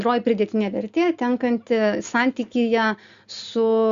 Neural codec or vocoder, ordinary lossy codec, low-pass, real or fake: none; Opus, 64 kbps; 7.2 kHz; real